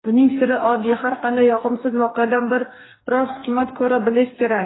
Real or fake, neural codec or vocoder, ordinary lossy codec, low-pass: fake; codec, 44.1 kHz, 2.6 kbps, DAC; AAC, 16 kbps; 7.2 kHz